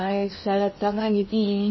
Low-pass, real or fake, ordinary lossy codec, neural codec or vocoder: 7.2 kHz; fake; MP3, 24 kbps; codec, 16 kHz in and 24 kHz out, 0.8 kbps, FocalCodec, streaming, 65536 codes